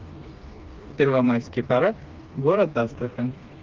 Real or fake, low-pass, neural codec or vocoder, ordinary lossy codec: fake; 7.2 kHz; codec, 16 kHz, 2 kbps, FreqCodec, smaller model; Opus, 24 kbps